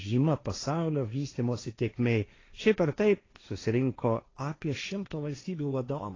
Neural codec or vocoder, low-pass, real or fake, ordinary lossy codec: codec, 16 kHz, 1.1 kbps, Voila-Tokenizer; 7.2 kHz; fake; AAC, 32 kbps